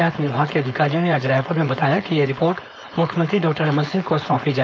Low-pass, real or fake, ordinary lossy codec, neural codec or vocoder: none; fake; none; codec, 16 kHz, 4.8 kbps, FACodec